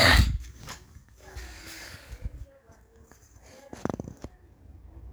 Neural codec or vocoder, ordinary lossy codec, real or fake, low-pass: codec, 44.1 kHz, 7.8 kbps, DAC; none; fake; none